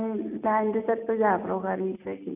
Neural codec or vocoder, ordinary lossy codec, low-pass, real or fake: none; none; 3.6 kHz; real